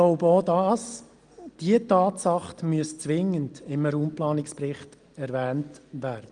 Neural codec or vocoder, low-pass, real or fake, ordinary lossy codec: none; 9.9 kHz; real; Opus, 24 kbps